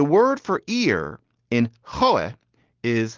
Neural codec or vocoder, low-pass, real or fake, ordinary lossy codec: none; 7.2 kHz; real; Opus, 16 kbps